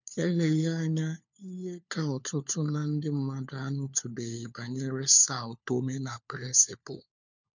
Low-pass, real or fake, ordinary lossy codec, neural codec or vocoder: 7.2 kHz; fake; none; codec, 16 kHz, 4 kbps, FunCodec, trained on LibriTTS, 50 frames a second